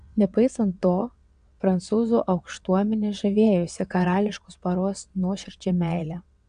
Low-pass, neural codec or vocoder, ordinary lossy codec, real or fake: 9.9 kHz; vocoder, 22.05 kHz, 80 mel bands, WaveNeXt; AAC, 96 kbps; fake